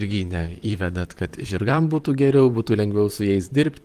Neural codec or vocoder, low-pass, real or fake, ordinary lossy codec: vocoder, 44.1 kHz, 128 mel bands, Pupu-Vocoder; 14.4 kHz; fake; Opus, 24 kbps